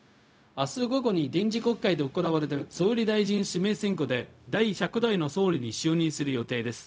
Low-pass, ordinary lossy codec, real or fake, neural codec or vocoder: none; none; fake; codec, 16 kHz, 0.4 kbps, LongCat-Audio-Codec